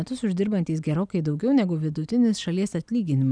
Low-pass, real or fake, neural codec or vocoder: 9.9 kHz; real; none